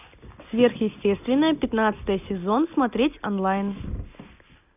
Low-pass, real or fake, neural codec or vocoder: 3.6 kHz; real; none